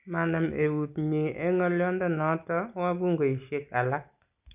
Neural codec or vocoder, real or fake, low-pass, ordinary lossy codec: none; real; 3.6 kHz; none